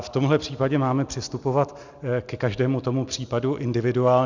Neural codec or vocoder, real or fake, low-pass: none; real; 7.2 kHz